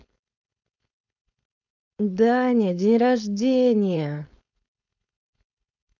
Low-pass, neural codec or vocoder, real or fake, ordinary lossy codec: 7.2 kHz; codec, 16 kHz, 4.8 kbps, FACodec; fake; none